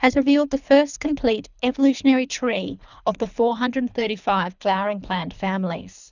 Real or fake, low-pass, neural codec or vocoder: fake; 7.2 kHz; codec, 24 kHz, 3 kbps, HILCodec